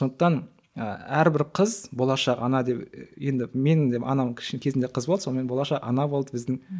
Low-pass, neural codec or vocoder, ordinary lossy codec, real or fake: none; none; none; real